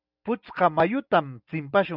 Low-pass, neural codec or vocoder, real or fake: 5.4 kHz; none; real